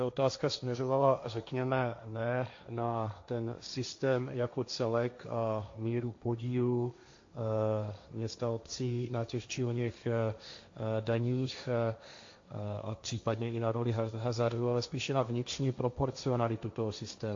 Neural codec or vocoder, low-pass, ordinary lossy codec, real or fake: codec, 16 kHz, 1.1 kbps, Voila-Tokenizer; 7.2 kHz; AAC, 48 kbps; fake